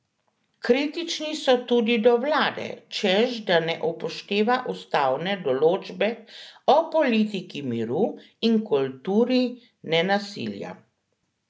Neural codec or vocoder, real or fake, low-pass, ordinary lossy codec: none; real; none; none